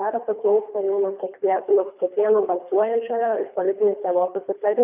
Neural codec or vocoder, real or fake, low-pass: codec, 24 kHz, 3 kbps, HILCodec; fake; 3.6 kHz